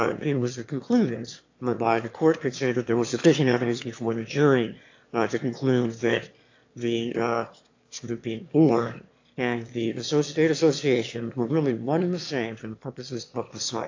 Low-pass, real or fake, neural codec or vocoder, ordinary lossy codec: 7.2 kHz; fake; autoencoder, 22.05 kHz, a latent of 192 numbers a frame, VITS, trained on one speaker; AAC, 48 kbps